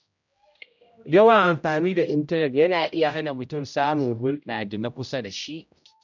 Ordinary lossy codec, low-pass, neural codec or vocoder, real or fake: none; 7.2 kHz; codec, 16 kHz, 0.5 kbps, X-Codec, HuBERT features, trained on general audio; fake